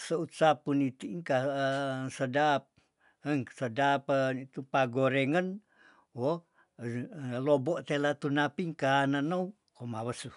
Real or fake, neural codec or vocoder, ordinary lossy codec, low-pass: real; none; none; 10.8 kHz